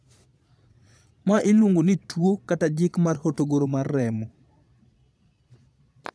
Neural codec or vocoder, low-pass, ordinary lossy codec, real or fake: vocoder, 22.05 kHz, 80 mel bands, Vocos; none; none; fake